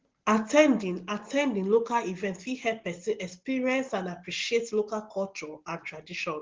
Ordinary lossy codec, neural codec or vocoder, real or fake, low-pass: Opus, 16 kbps; none; real; 7.2 kHz